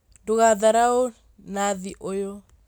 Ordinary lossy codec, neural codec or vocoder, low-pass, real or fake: none; none; none; real